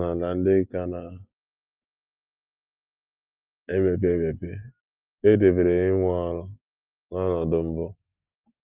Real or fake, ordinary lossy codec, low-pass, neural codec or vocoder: real; Opus, 24 kbps; 3.6 kHz; none